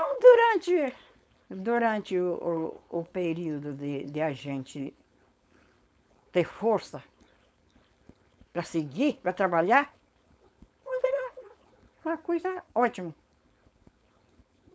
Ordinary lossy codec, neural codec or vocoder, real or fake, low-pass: none; codec, 16 kHz, 4.8 kbps, FACodec; fake; none